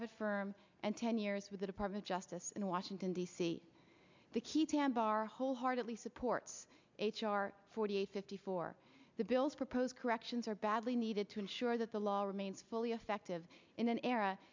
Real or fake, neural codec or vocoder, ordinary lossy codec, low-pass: real; none; MP3, 64 kbps; 7.2 kHz